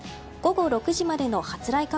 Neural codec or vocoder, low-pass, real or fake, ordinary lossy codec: none; none; real; none